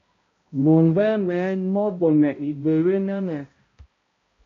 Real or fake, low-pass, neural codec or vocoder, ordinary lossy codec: fake; 7.2 kHz; codec, 16 kHz, 0.5 kbps, X-Codec, HuBERT features, trained on balanced general audio; AAC, 32 kbps